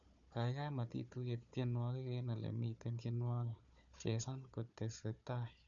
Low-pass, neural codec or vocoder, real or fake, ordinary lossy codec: 7.2 kHz; codec, 16 kHz, 4 kbps, FunCodec, trained on Chinese and English, 50 frames a second; fake; none